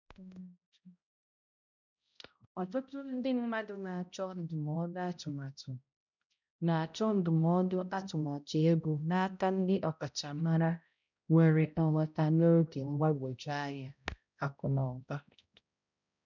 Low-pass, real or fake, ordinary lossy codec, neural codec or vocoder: 7.2 kHz; fake; none; codec, 16 kHz, 0.5 kbps, X-Codec, HuBERT features, trained on balanced general audio